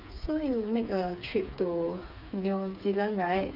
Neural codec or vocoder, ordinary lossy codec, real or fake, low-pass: codec, 16 kHz, 4 kbps, FreqCodec, smaller model; none; fake; 5.4 kHz